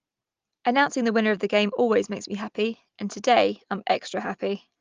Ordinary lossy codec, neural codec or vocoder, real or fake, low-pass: Opus, 32 kbps; none; real; 7.2 kHz